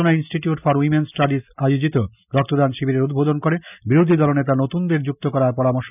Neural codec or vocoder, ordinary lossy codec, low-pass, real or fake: none; none; 3.6 kHz; real